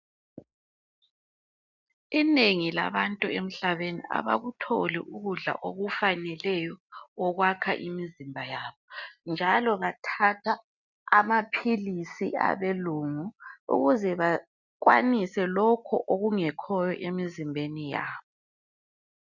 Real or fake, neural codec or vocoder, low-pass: real; none; 7.2 kHz